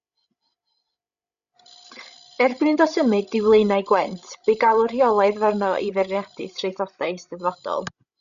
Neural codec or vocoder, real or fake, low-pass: codec, 16 kHz, 16 kbps, FreqCodec, larger model; fake; 7.2 kHz